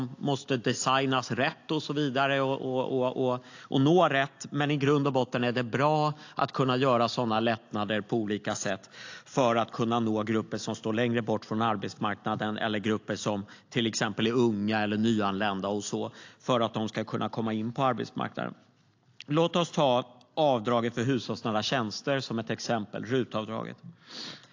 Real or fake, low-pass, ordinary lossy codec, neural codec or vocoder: real; 7.2 kHz; AAC, 48 kbps; none